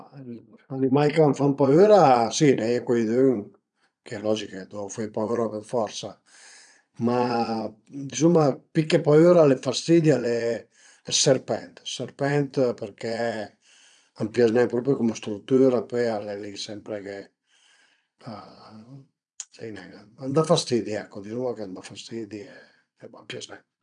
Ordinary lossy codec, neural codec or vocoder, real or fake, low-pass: none; vocoder, 22.05 kHz, 80 mel bands, Vocos; fake; 9.9 kHz